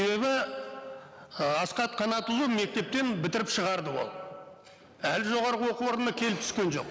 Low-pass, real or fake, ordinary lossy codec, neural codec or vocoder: none; real; none; none